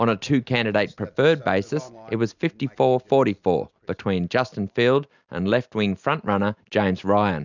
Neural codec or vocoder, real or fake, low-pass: none; real; 7.2 kHz